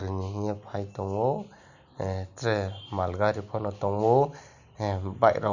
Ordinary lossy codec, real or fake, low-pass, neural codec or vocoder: none; real; 7.2 kHz; none